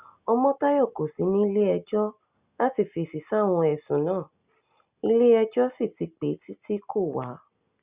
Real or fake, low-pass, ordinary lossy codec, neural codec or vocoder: real; 3.6 kHz; Opus, 64 kbps; none